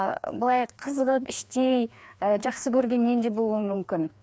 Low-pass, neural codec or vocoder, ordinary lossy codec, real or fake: none; codec, 16 kHz, 2 kbps, FreqCodec, larger model; none; fake